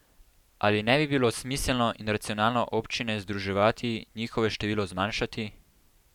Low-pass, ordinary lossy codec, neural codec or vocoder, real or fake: 19.8 kHz; none; vocoder, 44.1 kHz, 128 mel bands every 512 samples, BigVGAN v2; fake